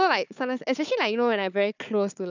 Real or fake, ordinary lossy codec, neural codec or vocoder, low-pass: fake; none; codec, 16 kHz, 4 kbps, FunCodec, trained on Chinese and English, 50 frames a second; 7.2 kHz